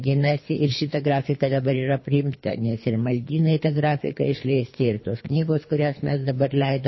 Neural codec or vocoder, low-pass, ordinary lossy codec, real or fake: codec, 24 kHz, 3 kbps, HILCodec; 7.2 kHz; MP3, 24 kbps; fake